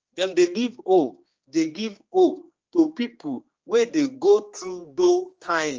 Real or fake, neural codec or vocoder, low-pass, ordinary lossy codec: fake; codec, 16 kHz, 2 kbps, X-Codec, HuBERT features, trained on general audio; 7.2 kHz; Opus, 24 kbps